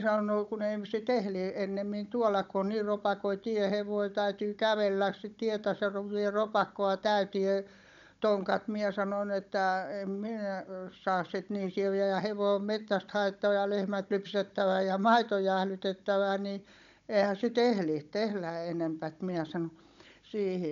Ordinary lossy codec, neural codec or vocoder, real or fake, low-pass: MP3, 64 kbps; codec, 16 kHz, 16 kbps, FunCodec, trained on Chinese and English, 50 frames a second; fake; 7.2 kHz